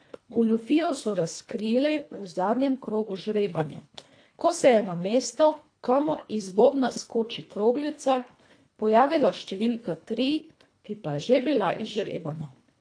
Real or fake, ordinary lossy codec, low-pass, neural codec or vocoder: fake; AAC, 48 kbps; 9.9 kHz; codec, 24 kHz, 1.5 kbps, HILCodec